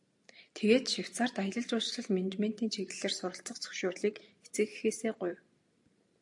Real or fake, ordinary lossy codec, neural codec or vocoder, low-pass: real; AAC, 64 kbps; none; 10.8 kHz